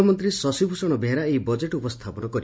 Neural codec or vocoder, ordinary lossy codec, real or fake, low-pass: none; none; real; none